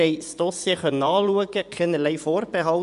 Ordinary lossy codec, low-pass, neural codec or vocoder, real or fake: none; 10.8 kHz; vocoder, 24 kHz, 100 mel bands, Vocos; fake